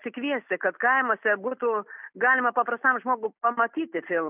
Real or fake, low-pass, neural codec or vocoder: real; 3.6 kHz; none